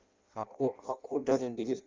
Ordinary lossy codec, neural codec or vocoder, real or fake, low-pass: Opus, 32 kbps; codec, 16 kHz in and 24 kHz out, 0.6 kbps, FireRedTTS-2 codec; fake; 7.2 kHz